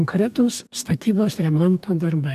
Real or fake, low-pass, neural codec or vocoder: fake; 14.4 kHz; codec, 44.1 kHz, 2.6 kbps, DAC